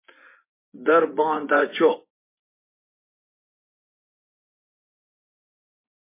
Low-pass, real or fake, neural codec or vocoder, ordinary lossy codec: 3.6 kHz; fake; vocoder, 24 kHz, 100 mel bands, Vocos; MP3, 24 kbps